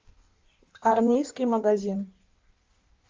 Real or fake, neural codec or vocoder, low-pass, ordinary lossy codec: fake; codec, 16 kHz in and 24 kHz out, 1.1 kbps, FireRedTTS-2 codec; 7.2 kHz; Opus, 32 kbps